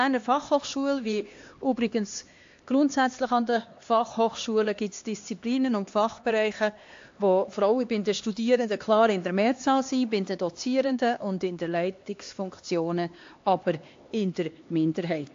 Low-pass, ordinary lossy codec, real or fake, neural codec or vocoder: 7.2 kHz; AAC, 48 kbps; fake; codec, 16 kHz, 2 kbps, X-Codec, HuBERT features, trained on LibriSpeech